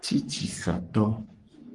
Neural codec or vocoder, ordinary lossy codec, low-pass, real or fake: codec, 44.1 kHz, 3.4 kbps, Pupu-Codec; Opus, 32 kbps; 10.8 kHz; fake